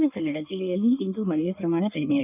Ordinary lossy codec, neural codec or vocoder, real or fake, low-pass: none; codec, 16 kHz in and 24 kHz out, 1.1 kbps, FireRedTTS-2 codec; fake; 3.6 kHz